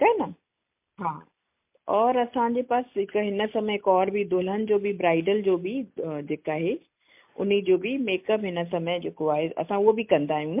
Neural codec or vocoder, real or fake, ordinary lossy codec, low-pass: none; real; MP3, 32 kbps; 3.6 kHz